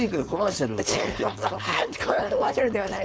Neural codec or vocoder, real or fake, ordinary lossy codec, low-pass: codec, 16 kHz, 4.8 kbps, FACodec; fake; none; none